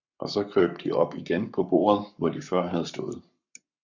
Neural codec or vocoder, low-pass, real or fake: codec, 44.1 kHz, 7.8 kbps, Pupu-Codec; 7.2 kHz; fake